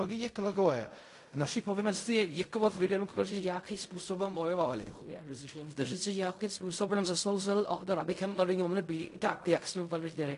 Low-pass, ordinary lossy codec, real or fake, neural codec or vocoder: 10.8 kHz; AAC, 48 kbps; fake; codec, 16 kHz in and 24 kHz out, 0.4 kbps, LongCat-Audio-Codec, fine tuned four codebook decoder